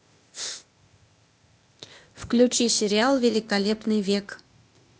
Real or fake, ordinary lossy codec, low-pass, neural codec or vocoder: fake; none; none; codec, 16 kHz, 0.8 kbps, ZipCodec